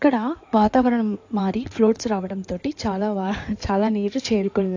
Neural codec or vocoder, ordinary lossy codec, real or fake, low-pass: codec, 16 kHz in and 24 kHz out, 2.2 kbps, FireRedTTS-2 codec; AAC, 48 kbps; fake; 7.2 kHz